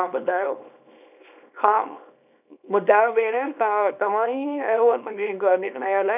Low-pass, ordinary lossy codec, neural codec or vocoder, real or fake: 3.6 kHz; none; codec, 24 kHz, 0.9 kbps, WavTokenizer, small release; fake